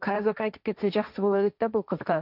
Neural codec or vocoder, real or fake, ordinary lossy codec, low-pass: codec, 16 kHz, 1.1 kbps, Voila-Tokenizer; fake; none; 5.4 kHz